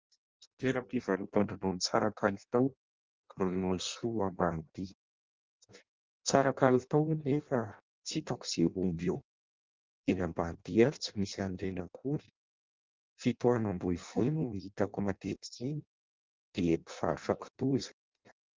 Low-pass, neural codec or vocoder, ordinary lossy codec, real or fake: 7.2 kHz; codec, 16 kHz in and 24 kHz out, 0.6 kbps, FireRedTTS-2 codec; Opus, 24 kbps; fake